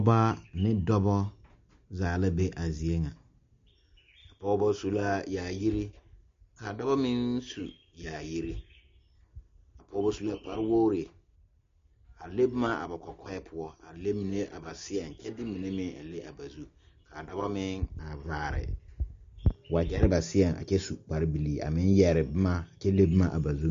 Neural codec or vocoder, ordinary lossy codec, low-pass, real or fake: none; MP3, 48 kbps; 7.2 kHz; real